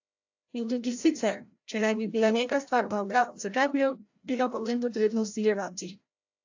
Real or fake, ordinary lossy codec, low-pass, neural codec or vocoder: fake; AAC, 48 kbps; 7.2 kHz; codec, 16 kHz, 0.5 kbps, FreqCodec, larger model